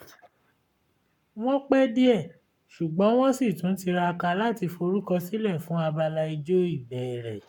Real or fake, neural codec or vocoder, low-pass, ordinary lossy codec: fake; codec, 44.1 kHz, 7.8 kbps, Pupu-Codec; 19.8 kHz; MP3, 96 kbps